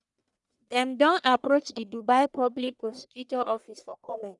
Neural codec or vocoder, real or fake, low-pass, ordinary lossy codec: codec, 44.1 kHz, 1.7 kbps, Pupu-Codec; fake; 10.8 kHz; none